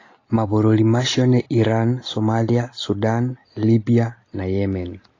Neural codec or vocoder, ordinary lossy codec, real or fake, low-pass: none; AAC, 32 kbps; real; 7.2 kHz